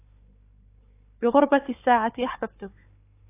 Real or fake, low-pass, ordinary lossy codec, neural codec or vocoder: fake; 3.6 kHz; AAC, 24 kbps; codec, 16 kHz, 4 kbps, FunCodec, trained on Chinese and English, 50 frames a second